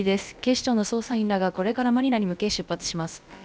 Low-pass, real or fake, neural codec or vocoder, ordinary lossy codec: none; fake; codec, 16 kHz, about 1 kbps, DyCAST, with the encoder's durations; none